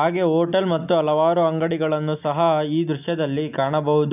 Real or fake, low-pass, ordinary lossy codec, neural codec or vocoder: real; 3.6 kHz; none; none